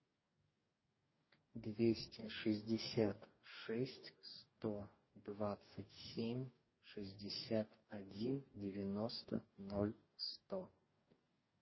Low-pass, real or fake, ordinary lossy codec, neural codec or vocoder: 7.2 kHz; fake; MP3, 24 kbps; codec, 44.1 kHz, 2.6 kbps, DAC